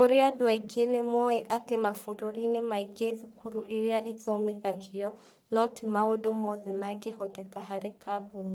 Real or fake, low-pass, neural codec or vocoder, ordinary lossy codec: fake; none; codec, 44.1 kHz, 1.7 kbps, Pupu-Codec; none